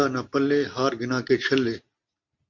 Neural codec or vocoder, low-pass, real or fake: none; 7.2 kHz; real